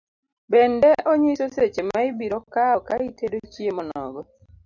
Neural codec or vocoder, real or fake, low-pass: none; real; 7.2 kHz